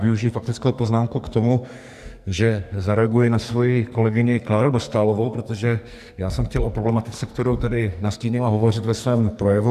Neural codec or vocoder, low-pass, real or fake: codec, 44.1 kHz, 2.6 kbps, SNAC; 14.4 kHz; fake